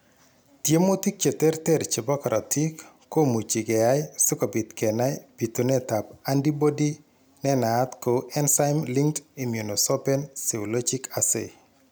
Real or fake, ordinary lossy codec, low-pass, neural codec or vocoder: real; none; none; none